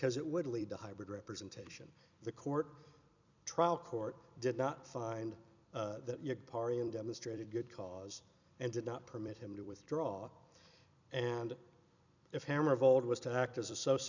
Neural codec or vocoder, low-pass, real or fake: vocoder, 44.1 kHz, 128 mel bands every 256 samples, BigVGAN v2; 7.2 kHz; fake